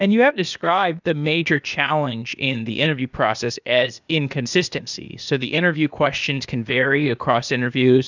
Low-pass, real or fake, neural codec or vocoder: 7.2 kHz; fake; codec, 16 kHz, 0.8 kbps, ZipCodec